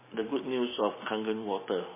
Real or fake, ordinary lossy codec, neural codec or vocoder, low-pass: real; MP3, 16 kbps; none; 3.6 kHz